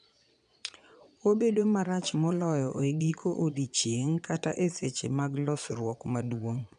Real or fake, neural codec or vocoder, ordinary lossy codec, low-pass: fake; vocoder, 22.05 kHz, 80 mel bands, Vocos; none; 9.9 kHz